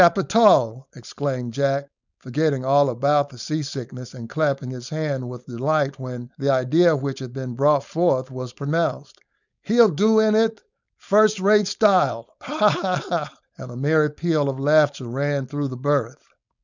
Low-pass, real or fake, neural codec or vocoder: 7.2 kHz; fake; codec, 16 kHz, 4.8 kbps, FACodec